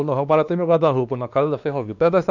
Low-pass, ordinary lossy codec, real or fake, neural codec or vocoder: 7.2 kHz; none; fake; codec, 16 kHz, 2 kbps, X-Codec, WavLM features, trained on Multilingual LibriSpeech